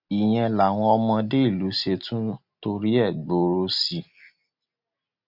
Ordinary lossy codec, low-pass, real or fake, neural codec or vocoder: none; 5.4 kHz; real; none